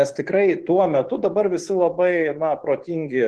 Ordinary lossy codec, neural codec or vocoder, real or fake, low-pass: Opus, 16 kbps; vocoder, 24 kHz, 100 mel bands, Vocos; fake; 10.8 kHz